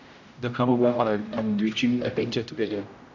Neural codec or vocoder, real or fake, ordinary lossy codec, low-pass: codec, 16 kHz, 0.5 kbps, X-Codec, HuBERT features, trained on general audio; fake; none; 7.2 kHz